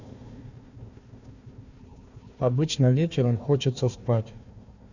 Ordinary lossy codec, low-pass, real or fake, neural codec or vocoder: none; 7.2 kHz; fake; codec, 16 kHz, 1 kbps, FunCodec, trained on Chinese and English, 50 frames a second